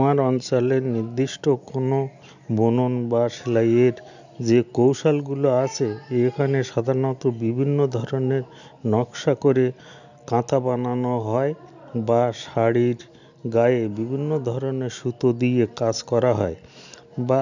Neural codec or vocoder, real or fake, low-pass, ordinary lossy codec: none; real; 7.2 kHz; none